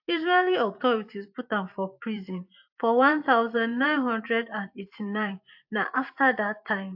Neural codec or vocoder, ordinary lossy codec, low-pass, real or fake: vocoder, 44.1 kHz, 128 mel bands every 512 samples, BigVGAN v2; AAC, 48 kbps; 5.4 kHz; fake